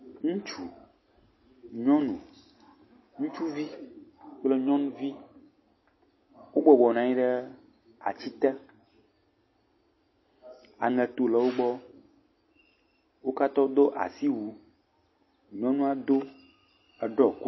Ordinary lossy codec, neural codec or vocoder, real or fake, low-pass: MP3, 24 kbps; none; real; 7.2 kHz